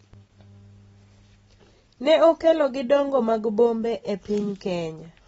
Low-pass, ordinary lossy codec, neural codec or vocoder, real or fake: 19.8 kHz; AAC, 24 kbps; none; real